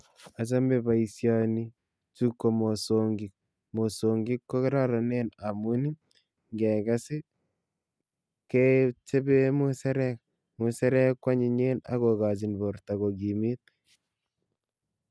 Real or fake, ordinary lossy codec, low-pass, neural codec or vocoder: real; none; none; none